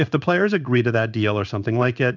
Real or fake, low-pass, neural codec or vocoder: fake; 7.2 kHz; codec, 16 kHz in and 24 kHz out, 1 kbps, XY-Tokenizer